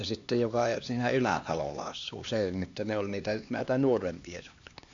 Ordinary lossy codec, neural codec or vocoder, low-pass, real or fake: AAC, 64 kbps; codec, 16 kHz, 2 kbps, X-Codec, HuBERT features, trained on LibriSpeech; 7.2 kHz; fake